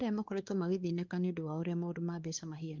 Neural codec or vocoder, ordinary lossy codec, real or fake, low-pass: codec, 16 kHz, 2 kbps, X-Codec, WavLM features, trained on Multilingual LibriSpeech; Opus, 32 kbps; fake; 7.2 kHz